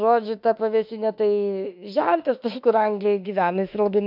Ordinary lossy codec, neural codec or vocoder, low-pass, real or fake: MP3, 48 kbps; autoencoder, 48 kHz, 32 numbers a frame, DAC-VAE, trained on Japanese speech; 5.4 kHz; fake